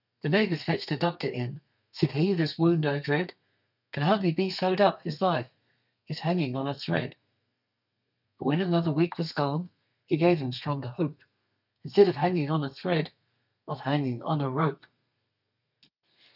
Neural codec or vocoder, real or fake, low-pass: codec, 32 kHz, 1.9 kbps, SNAC; fake; 5.4 kHz